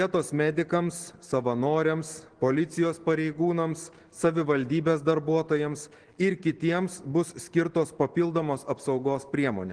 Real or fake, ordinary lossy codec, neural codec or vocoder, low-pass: real; Opus, 16 kbps; none; 9.9 kHz